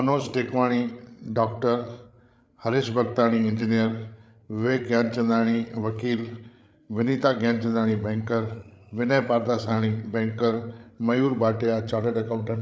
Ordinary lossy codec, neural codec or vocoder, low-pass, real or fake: none; codec, 16 kHz, 8 kbps, FreqCodec, larger model; none; fake